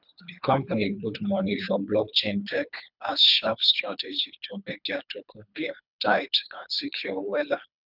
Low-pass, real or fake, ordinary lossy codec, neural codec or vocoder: 5.4 kHz; fake; none; codec, 24 kHz, 3 kbps, HILCodec